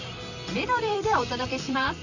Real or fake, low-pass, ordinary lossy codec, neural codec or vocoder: fake; 7.2 kHz; none; vocoder, 44.1 kHz, 128 mel bands, Pupu-Vocoder